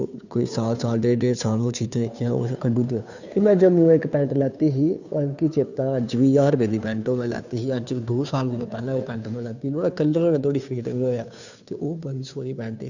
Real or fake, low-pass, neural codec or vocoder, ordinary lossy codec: fake; 7.2 kHz; codec, 16 kHz, 2 kbps, FunCodec, trained on Chinese and English, 25 frames a second; none